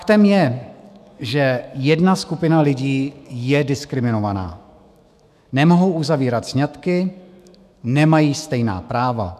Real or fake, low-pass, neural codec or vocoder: fake; 14.4 kHz; autoencoder, 48 kHz, 128 numbers a frame, DAC-VAE, trained on Japanese speech